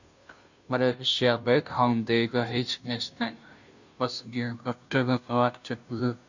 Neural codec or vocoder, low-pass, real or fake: codec, 16 kHz, 0.5 kbps, FunCodec, trained on Chinese and English, 25 frames a second; 7.2 kHz; fake